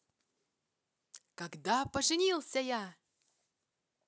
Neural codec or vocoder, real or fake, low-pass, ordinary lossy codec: none; real; none; none